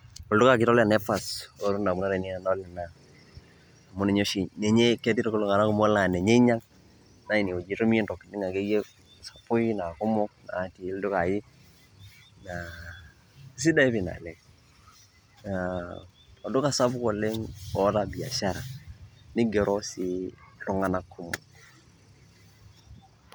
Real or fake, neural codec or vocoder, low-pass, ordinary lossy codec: real; none; none; none